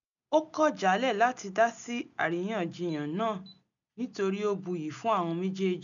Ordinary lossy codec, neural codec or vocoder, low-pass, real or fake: none; none; 7.2 kHz; real